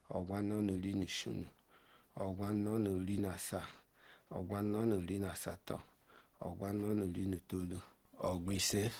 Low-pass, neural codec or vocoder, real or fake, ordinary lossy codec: 19.8 kHz; none; real; Opus, 16 kbps